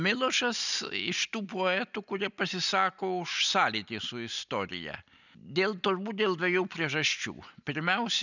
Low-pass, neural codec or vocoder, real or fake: 7.2 kHz; none; real